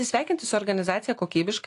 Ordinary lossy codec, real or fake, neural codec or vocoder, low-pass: AAC, 48 kbps; real; none; 10.8 kHz